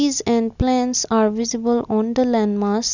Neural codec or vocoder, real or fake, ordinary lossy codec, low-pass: none; real; none; 7.2 kHz